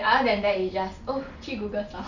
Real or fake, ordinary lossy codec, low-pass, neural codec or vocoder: real; none; 7.2 kHz; none